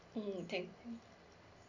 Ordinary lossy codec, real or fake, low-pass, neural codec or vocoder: none; real; 7.2 kHz; none